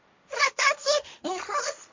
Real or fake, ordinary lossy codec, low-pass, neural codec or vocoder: fake; MP3, 64 kbps; 7.2 kHz; codec, 16 kHz, 1.1 kbps, Voila-Tokenizer